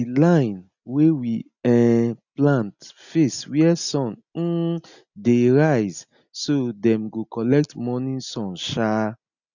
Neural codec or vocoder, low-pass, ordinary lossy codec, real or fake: none; 7.2 kHz; none; real